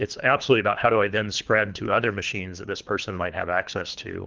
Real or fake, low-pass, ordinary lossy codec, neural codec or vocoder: fake; 7.2 kHz; Opus, 24 kbps; codec, 24 kHz, 3 kbps, HILCodec